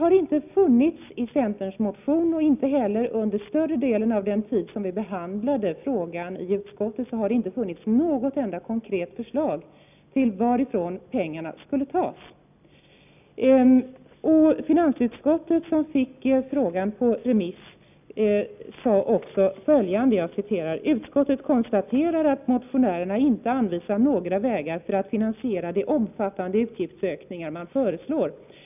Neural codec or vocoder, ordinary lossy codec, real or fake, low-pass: none; none; real; 3.6 kHz